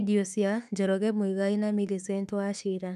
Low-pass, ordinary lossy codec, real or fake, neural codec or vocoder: 14.4 kHz; none; fake; autoencoder, 48 kHz, 32 numbers a frame, DAC-VAE, trained on Japanese speech